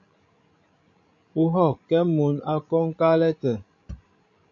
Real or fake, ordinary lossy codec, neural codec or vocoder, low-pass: fake; MP3, 48 kbps; codec, 16 kHz, 16 kbps, FreqCodec, larger model; 7.2 kHz